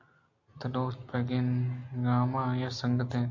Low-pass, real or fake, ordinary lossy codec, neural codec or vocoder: 7.2 kHz; real; AAC, 48 kbps; none